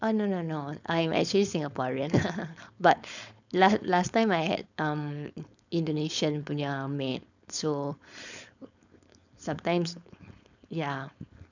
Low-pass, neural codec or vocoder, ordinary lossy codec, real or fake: 7.2 kHz; codec, 16 kHz, 4.8 kbps, FACodec; none; fake